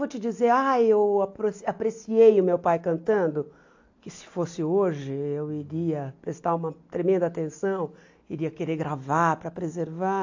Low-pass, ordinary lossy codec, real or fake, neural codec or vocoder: 7.2 kHz; AAC, 48 kbps; real; none